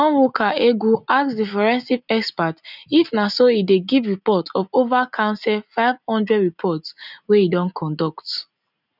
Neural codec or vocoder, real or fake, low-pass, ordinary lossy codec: none; real; 5.4 kHz; none